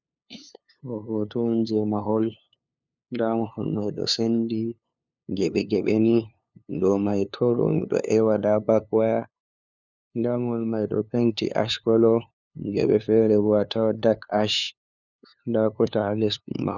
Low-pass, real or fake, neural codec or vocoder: 7.2 kHz; fake; codec, 16 kHz, 2 kbps, FunCodec, trained on LibriTTS, 25 frames a second